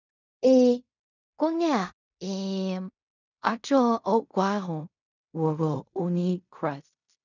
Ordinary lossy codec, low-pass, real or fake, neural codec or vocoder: none; 7.2 kHz; fake; codec, 16 kHz in and 24 kHz out, 0.4 kbps, LongCat-Audio-Codec, fine tuned four codebook decoder